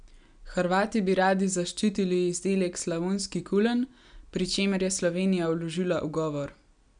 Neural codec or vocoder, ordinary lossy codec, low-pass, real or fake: none; none; 9.9 kHz; real